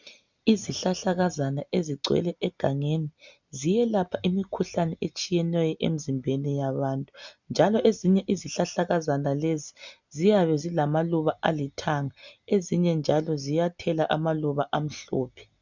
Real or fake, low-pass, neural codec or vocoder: real; 7.2 kHz; none